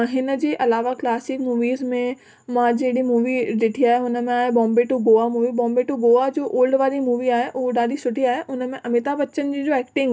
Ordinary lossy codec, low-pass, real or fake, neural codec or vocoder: none; none; real; none